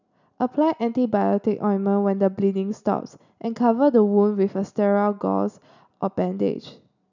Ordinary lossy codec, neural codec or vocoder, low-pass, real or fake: none; none; 7.2 kHz; real